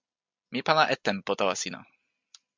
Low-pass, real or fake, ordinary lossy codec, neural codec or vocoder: 7.2 kHz; real; MP3, 64 kbps; none